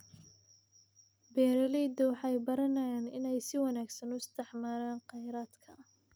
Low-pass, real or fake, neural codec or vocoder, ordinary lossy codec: none; real; none; none